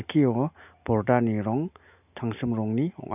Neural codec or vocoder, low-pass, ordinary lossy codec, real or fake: none; 3.6 kHz; none; real